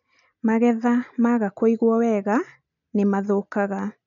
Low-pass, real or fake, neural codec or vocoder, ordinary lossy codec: 7.2 kHz; real; none; none